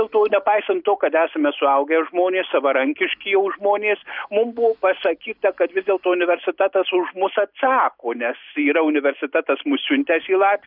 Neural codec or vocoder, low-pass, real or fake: none; 5.4 kHz; real